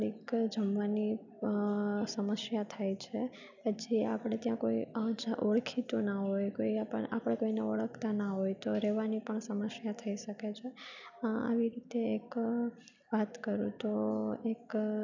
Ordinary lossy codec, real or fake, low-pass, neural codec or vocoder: none; real; 7.2 kHz; none